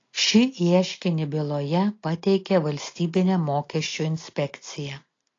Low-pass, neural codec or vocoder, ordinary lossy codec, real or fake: 7.2 kHz; none; AAC, 32 kbps; real